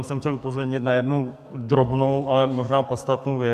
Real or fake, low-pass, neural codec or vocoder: fake; 14.4 kHz; codec, 44.1 kHz, 2.6 kbps, SNAC